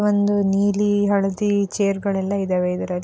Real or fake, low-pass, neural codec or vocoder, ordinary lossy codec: real; none; none; none